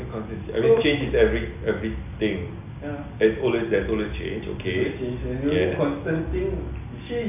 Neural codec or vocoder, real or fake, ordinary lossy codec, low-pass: none; real; none; 3.6 kHz